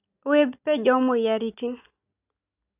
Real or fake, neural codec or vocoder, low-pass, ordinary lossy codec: real; none; 3.6 kHz; none